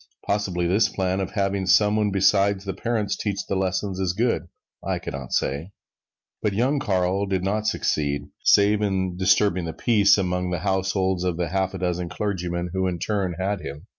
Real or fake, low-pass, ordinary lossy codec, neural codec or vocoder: real; 7.2 kHz; MP3, 64 kbps; none